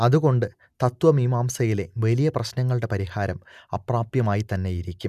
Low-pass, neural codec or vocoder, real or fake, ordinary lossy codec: 14.4 kHz; none; real; none